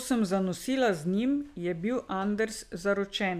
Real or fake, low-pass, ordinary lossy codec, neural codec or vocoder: real; 14.4 kHz; none; none